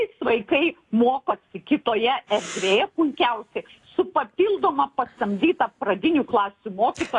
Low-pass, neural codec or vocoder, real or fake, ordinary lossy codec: 10.8 kHz; none; real; AAC, 48 kbps